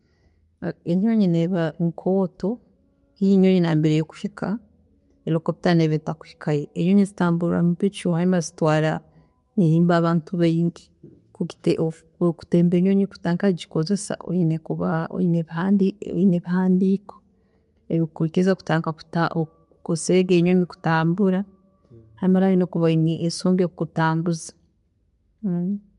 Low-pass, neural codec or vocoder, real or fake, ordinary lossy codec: 10.8 kHz; none; real; MP3, 64 kbps